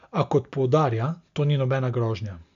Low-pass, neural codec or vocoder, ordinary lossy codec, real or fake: 7.2 kHz; none; none; real